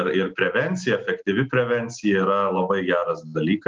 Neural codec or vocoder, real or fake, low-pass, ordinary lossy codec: none; real; 7.2 kHz; Opus, 32 kbps